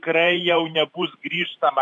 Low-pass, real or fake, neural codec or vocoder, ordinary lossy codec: 10.8 kHz; fake; vocoder, 44.1 kHz, 128 mel bands every 512 samples, BigVGAN v2; MP3, 96 kbps